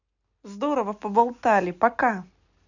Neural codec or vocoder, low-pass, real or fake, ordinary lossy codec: none; 7.2 kHz; real; none